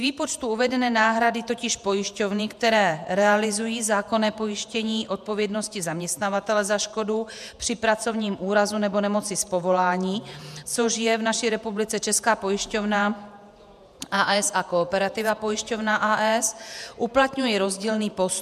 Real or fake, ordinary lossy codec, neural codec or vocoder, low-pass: fake; MP3, 96 kbps; vocoder, 48 kHz, 128 mel bands, Vocos; 14.4 kHz